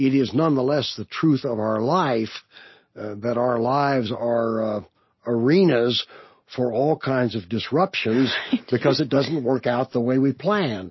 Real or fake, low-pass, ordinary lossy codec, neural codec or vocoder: real; 7.2 kHz; MP3, 24 kbps; none